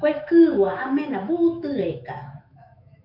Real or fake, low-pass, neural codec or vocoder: fake; 5.4 kHz; autoencoder, 48 kHz, 128 numbers a frame, DAC-VAE, trained on Japanese speech